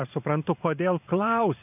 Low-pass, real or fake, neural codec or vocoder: 3.6 kHz; fake; vocoder, 44.1 kHz, 128 mel bands every 512 samples, BigVGAN v2